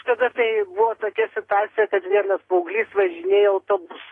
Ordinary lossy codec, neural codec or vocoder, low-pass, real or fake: AAC, 32 kbps; none; 10.8 kHz; real